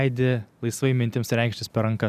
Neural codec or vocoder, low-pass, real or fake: none; 14.4 kHz; real